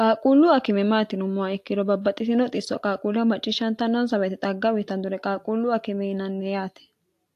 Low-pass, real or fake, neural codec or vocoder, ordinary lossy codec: 14.4 kHz; real; none; AAC, 64 kbps